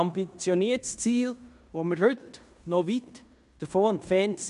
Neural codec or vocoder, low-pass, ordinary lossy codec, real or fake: codec, 16 kHz in and 24 kHz out, 0.9 kbps, LongCat-Audio-Codec, fine tuned four codebook decoder; 10.8 kHz; AAC, 96 kbps; fake